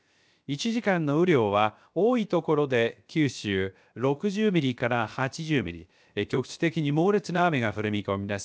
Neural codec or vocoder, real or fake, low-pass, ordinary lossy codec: codec, 16 kHz, 0.7 kbps, FocalCodec; fake; none; none